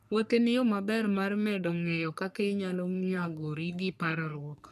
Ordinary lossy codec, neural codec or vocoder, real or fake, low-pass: none; codec, 44.1 kHz, 3.4 kbps, Pupu-Codec; fake; 14.4 kHz